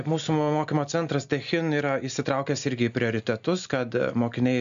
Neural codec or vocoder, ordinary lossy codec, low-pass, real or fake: none; AAC, 96 kbps; 7.2 kHz; real